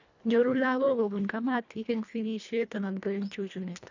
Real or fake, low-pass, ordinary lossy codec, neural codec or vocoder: fake; 7.2 kHz; none; codec, 24 kHz, 1.5 kbps, HILCodec